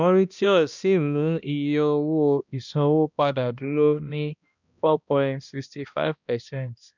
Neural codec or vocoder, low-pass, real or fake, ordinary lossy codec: codec, 16 kHz, 1 kbps, X-Codec, HuBERT features, trained on balanced general audio; 7.2 kHz; fake; none